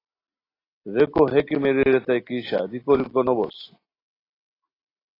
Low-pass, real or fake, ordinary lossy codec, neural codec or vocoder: 5.4 kHz; real; AAC, 24 kbps; none